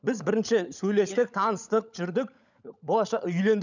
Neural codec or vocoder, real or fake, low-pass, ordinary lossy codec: codec, 16 kHz, 16 kbps, FunCodec, trained on LibriTTS, 50 frames a second; fake; 7.2 kHz; none